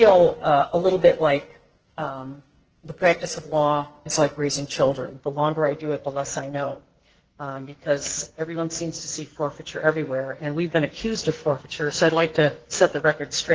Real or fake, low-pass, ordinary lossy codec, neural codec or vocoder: fake; 7.2 kHz; Opus, 16 kbps; codec, 44.1 kHz, 2.6 kbps, SNAC